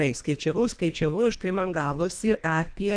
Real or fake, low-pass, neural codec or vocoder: fake; 9.9 kHz; codec, 24 kHz, 1.5 kbps, HILCodec